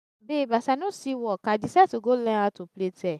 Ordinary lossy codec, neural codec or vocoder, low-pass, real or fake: none; none; 14.4 kHz; real